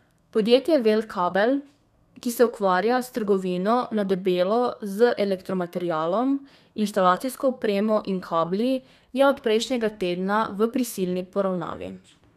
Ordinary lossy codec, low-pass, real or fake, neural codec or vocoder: none; 14.4 kHz; fake; codec, 32 kHz, 1.9 kbps, SNAC